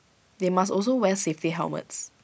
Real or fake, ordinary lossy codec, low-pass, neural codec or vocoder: real; none; none; none